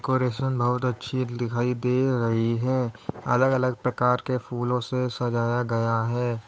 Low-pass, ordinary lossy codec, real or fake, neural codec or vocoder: none; none; fake; codec, 16 kHz, 8 kbps, FunCodec, trained on Chinese and English, 25 frames a second